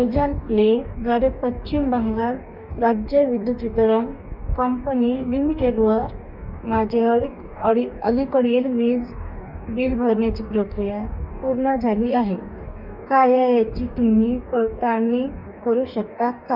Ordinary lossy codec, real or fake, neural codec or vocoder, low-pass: none; fake; codec, 44.1 kHz, 2.6 kbps, DAC; 5.4 kHz